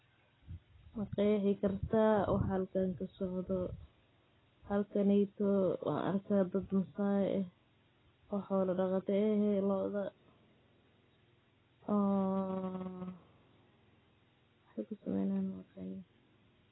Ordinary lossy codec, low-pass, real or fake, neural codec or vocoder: AAC, 16 kbps; 7.2 kHz; real; none